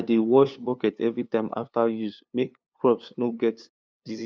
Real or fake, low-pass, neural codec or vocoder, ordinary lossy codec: fake; none; codec, 16 kHz, 2 kbps, FunCodec, trained on LibriTTS, 25 frames a second; none